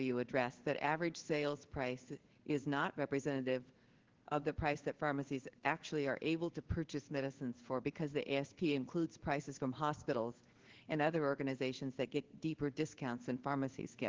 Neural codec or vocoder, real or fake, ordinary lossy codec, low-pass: codec, 16 kHz in and 24 kHz out, 1 kbps, XY-Tokenizer; fake; Opus, 16 kbps; 7.2 kHz